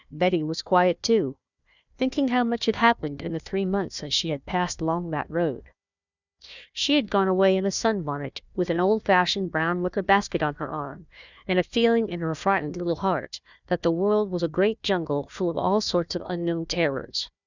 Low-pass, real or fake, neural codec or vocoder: 7.2 kHz; fake; codec, 16 kHz, 1 kbps, FunCodec, trained on Chinese and English, 50 frames a second